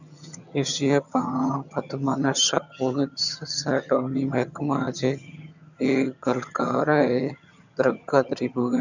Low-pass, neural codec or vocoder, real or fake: 7.2 kHz; vocoder, 22.05 kHz, 80 mel bands, HiFi-GAN; fake